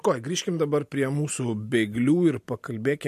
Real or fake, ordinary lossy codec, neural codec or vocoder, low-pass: real; MP3, 64 kbps; none; 14.4 kHz